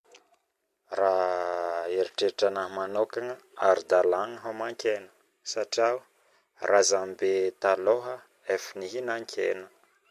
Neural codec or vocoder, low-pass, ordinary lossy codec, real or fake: none; 14.4 kHz; MP3, 64 kbps; real